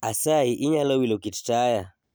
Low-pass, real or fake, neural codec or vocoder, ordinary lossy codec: none; real; none; none